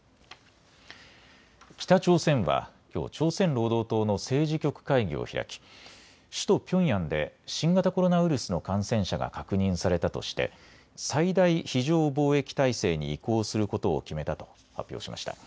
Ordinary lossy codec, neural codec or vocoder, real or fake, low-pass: none; none; real; none